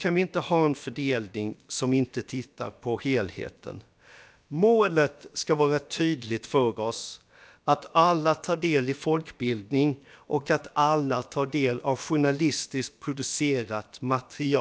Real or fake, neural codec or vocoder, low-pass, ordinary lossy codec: fake; codec, 16 kHz, about 1 kbps, DyCAST, with the encoder's durations; none; none